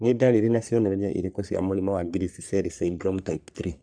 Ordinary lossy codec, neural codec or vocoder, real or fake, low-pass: none; codec, 44.1 kHz, 3.4 kbps, Pupu-Codec; fake; 9.9 kHz